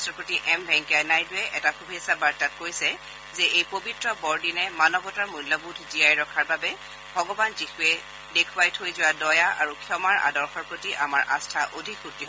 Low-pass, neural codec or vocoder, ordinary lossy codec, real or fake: none; none; none; real